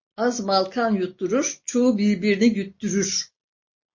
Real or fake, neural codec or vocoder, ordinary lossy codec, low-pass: real; none; MP3, 32 kbps; 7.2 kHz